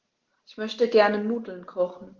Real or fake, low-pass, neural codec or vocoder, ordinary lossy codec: real; 7.2 kHz; none; Opus, 16 kbps